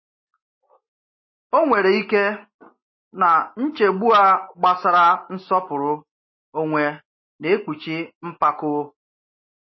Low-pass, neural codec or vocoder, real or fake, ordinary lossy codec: 7.2 kHz; none; real; MP3, 24 kbps